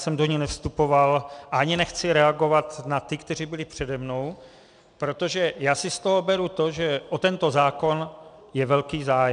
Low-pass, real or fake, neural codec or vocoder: 9.9 kHz; real; none